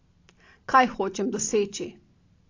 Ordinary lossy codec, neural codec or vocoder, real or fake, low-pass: AAC, 32 kbps; none; real; 7.2 kHz